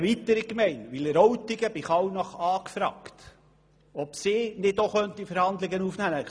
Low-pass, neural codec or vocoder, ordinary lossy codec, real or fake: none; none; none; real